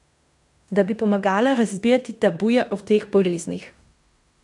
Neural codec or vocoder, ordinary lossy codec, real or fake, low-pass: codec, 16 kHz in and 24 kHz out, 0.9 kbps, LongCat-Audio-Codec, fine tuned four codebook decoder; none; fake; 10.8 kHz